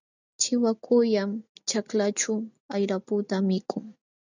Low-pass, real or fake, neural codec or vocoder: 7.2 kHz; real; none